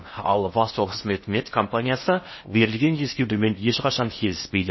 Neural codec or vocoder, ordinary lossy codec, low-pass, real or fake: codec, 16 kHz in and 24 kHz out, 0.6 kbps, FocalCodec, streaming, 4096 codes; MP3, 24 kbps; 7.2 kHz; fake